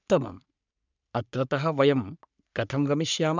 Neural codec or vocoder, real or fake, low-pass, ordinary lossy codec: codec, 44.1 kHz, 3.4 kbps, Pupu-Codec; fake; 7.2 kHz; none